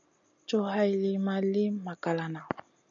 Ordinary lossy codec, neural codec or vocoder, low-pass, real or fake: AAC, 64 kbps; none; 7.2 kHz; real